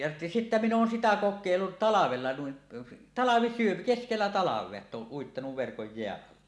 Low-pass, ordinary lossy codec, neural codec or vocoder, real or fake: none; none; none; real